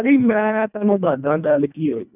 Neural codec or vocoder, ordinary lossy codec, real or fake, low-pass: codec, 24 kHz, 1.5 kbps, HILCodec; none; fake; 3.6 kHz